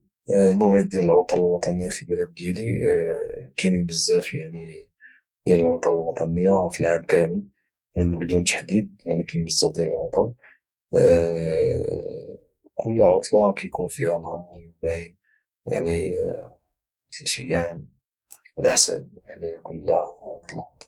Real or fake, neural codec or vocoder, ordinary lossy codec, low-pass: fake; codec, 44.1 kHz, 2.6 kbps, DAC; none; 19.8 kHz